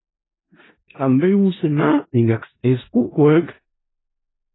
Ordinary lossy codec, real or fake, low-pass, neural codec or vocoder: AAC, 16 kbps; fake; 7.2 kHz; codec, 16 kHz in and 24 kHz out, 0.4 kbps, LongCat-Audio-Codec, four codebook decoder